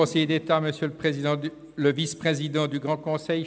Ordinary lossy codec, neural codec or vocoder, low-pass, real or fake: none; none; none; real